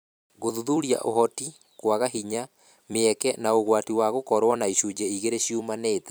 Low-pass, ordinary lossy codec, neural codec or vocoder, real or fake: none; none; vocoder, 44.1 kHz, 128 mel bands every 256 samples, BigVGAN v2; fake